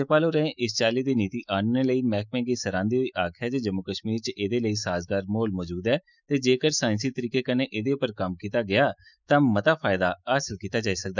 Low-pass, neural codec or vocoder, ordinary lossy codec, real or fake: 7.2 kHz; autoencoder, 48 kHz, 128 numbers a frame, DAC-VAE, trained on Japanese speech; none; fake